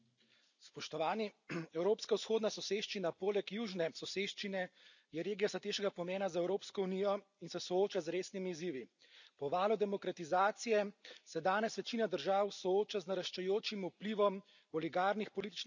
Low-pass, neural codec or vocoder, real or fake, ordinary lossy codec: 7.2 kHz; none; real; none